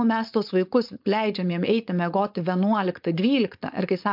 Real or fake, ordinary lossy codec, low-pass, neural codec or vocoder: fake; MP3, 48 kbps; 5.4 kHz; codec, 16 kHz, 4.8 kbps, FACodec